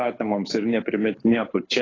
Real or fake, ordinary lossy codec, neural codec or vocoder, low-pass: fake; AAC, 32 kbps; codec, 24 kHz, 6 kbps, HILCodec; 7.2 kHz